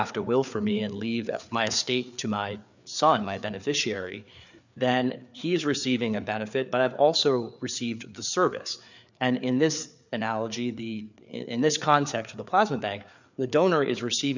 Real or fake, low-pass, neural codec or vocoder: fake; 7.2 kHz; codec, 16 kHz, 4 kbps, FreqCodec, larger model